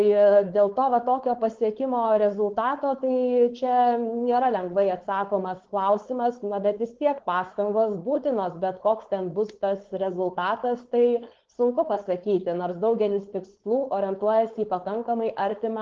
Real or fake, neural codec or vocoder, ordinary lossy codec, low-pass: fake; codec, 16 kHz, 4.8 kbps, FACodec; Opus, 16 kbps; 7.2 kHz